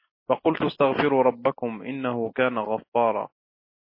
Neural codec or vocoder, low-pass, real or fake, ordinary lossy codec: none; 5.4 kHz; real; MP3, 24 kbps